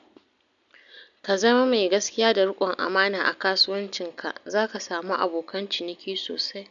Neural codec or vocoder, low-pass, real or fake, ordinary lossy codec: none; 7.2 kHz; real; none